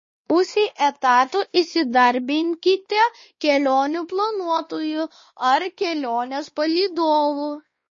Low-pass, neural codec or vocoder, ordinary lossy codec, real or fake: 7.2 kHz; codec, 16 kHz, 2 kbps, X-Codec, WavLM features, trained on Multilingual LibriSpeech; MP3, 32 kbps; fake